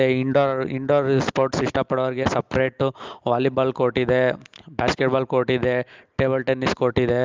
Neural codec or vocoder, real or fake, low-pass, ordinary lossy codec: none; real; 7.2 kHz; Opus, 32 kbps